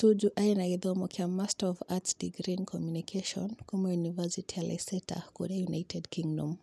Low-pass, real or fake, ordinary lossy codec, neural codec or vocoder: none; real; none; none